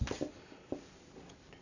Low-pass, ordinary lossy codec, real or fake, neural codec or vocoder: 7.2 kHz; none; real; none